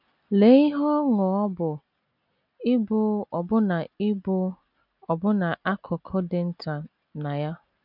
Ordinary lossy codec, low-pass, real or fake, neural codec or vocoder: none; 5.4 kHz; real; none